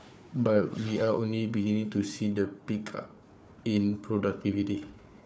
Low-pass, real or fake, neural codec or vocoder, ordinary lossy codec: none; fake; codec, 16 kHz, 4 kbps, FunCodec, trained on Chinese and English, 50 frames a second; none